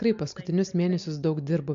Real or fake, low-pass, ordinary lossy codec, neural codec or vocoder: real; 7.2 kHz; AAC, 64 kbps; none